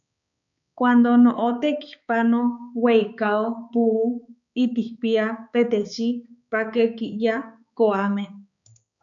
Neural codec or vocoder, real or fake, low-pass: codec, 16 kHz, 4 kbps, X-Codec, HuBERT features, trained on balanced general audio; fake; 7.2 kHz